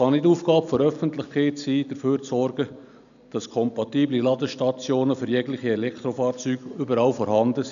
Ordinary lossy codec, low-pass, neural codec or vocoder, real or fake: none; 7.2 kHz; none; real